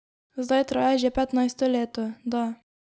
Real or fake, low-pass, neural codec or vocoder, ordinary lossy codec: real; none; none; none